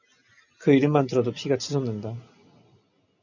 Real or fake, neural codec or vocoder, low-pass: real; none; 7.2 kHz